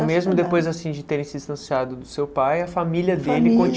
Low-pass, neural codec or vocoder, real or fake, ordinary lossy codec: none; none; real; none